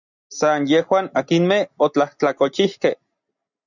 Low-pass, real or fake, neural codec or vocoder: 7.2 kHz; real; none